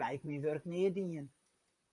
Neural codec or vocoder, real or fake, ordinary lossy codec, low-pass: vocoder, 44.1 kHz, 128 mel bands, Pupu-Vocoder; fake; MP3, 64 kbps; 10.8 kHz